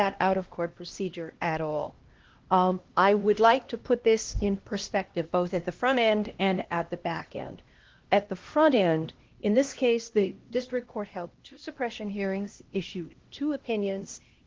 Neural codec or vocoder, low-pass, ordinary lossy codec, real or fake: codec, 16 kHz, 1 kbps, X-Codec, HuBERT features, trained on LibriSpeech; 7.2 kHz; Opus, 16 kbps; fake